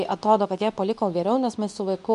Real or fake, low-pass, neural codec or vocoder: fake; 10.8 kHz; codec, 24 kHz, 0.9 kbps, WavTokenizer, medium speech release version 2